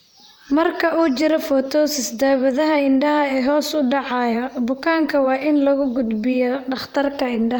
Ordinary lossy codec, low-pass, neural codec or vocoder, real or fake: none; none; vocoder, 44.1 kHz, 128 mel bands, Pupu-Vocoder; fake